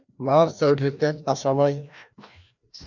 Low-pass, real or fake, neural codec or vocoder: 7.2 kHz; fake; codec, 16 kHz, 1 kbps, FreqCodec, larger model